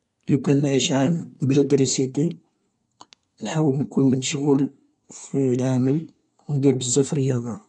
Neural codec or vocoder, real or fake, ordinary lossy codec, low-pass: codec, 24 kHz, 1 kbps, SNAC; fake; MP3, 96 kbps; 10.8 kHz